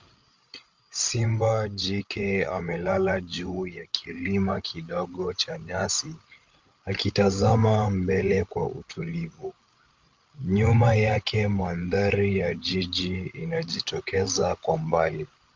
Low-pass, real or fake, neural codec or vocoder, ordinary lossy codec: 7.2 kHz; fake; codec, 16 kHz, 16 kbps, FreqCodec, larger model; Opus, 32 kbps